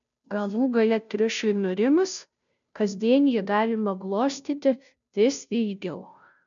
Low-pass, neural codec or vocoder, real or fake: 7.2 kHz; codec, 16 kHz, 0.5 kbps, FunCodec, trained on Chinese and English, 25 frames a second; fake